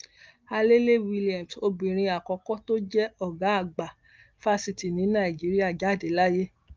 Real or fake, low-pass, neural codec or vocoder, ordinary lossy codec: real; 7.2 kHz; none; Opus, 24 kbps